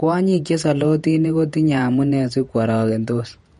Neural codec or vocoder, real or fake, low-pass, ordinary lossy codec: vocoder, 48 kHz, 128 mel bands, Vocos; fake; 19.8 kHz; MP3, 48 kbps